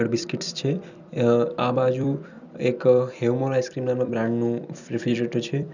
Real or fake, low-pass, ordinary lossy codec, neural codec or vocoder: fake; 7.2 kHz; none; vocoder, 44.1 kHz, 128 mel bands every 256 samples, BigVGAN v2